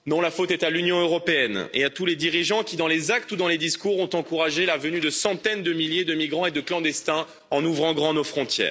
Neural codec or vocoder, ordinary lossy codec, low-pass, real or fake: none; none; none; real